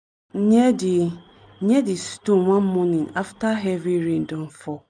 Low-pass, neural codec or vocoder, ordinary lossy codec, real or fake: 9.9 kHz; none; none; real